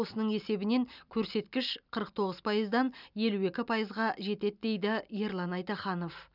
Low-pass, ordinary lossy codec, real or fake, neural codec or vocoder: 5.4 kHz; none; real; none